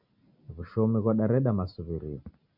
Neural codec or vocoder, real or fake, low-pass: none; real; 5.4 kHz